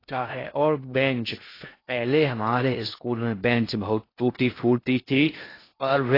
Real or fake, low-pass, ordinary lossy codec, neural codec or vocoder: fake; 5.4 kHz; AAC, 24 kbps; codec, 16 kHz in and 24 kHz out, 0.6 kbps, FocalCodec, streaming, 2048 codes